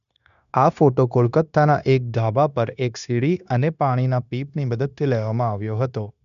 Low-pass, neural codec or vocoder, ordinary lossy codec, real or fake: 7.2 kHz; codec, 16 kHz, 0.9 kbps, LongCat-Audio-Codec; none; fake